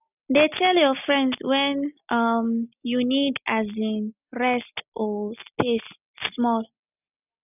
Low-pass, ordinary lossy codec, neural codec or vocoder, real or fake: 3.6 kHz; none; none; real